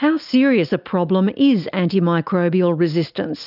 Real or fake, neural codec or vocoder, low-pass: real; none; 5.4 kHz